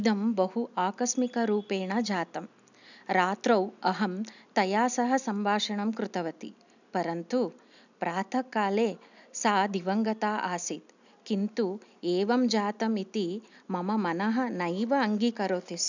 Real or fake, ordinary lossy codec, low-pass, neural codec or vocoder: real; none; 7.2 kHz; none